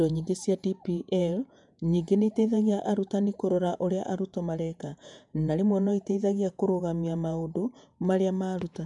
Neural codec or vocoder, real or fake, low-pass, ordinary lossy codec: vocoder, 48 kHz, 128 mel bands, Vocos; fake; 10.8 kHz; none